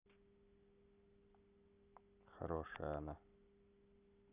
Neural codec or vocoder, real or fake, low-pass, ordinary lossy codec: none; real; 3.6 kHz; none